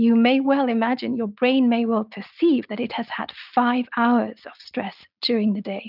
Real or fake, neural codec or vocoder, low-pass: real; none; 5.4 kHz